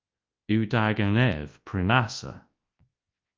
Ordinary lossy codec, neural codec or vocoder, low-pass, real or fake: Opus, 32 kbps; codec, 24 kHz, 0.9 kbps, WavTokenizer, large speech release; 7.2 kHz; fake